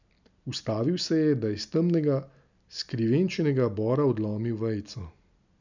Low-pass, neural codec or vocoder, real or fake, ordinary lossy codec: 7.2 kHz; none; real; none